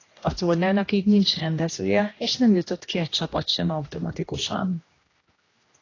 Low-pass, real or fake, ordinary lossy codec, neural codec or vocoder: 7.2 kHz; fake; AAC, 32 kbps; codec, 16 kHz, 1 kbps, X-Codec, HuBERT features, trained on general audio